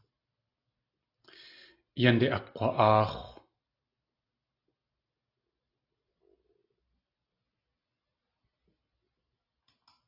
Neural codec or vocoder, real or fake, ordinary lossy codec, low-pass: none; real; AAC, 32 kbps; 5.4 kHz